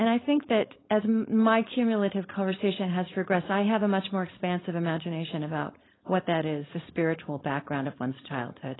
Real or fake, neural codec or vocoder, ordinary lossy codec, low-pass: fake; codec, 16 kHz, 4.8 kbps, FACodec; AAC, 16 kbps; 7.2 kHz